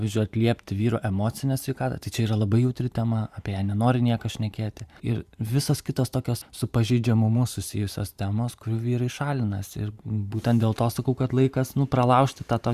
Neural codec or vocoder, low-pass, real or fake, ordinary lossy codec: vocoder, 44.1 kHz, 128 mel bands every 512 samples, BigVGAN v2; 14.4 kHz; fake; Opus, 64 kbps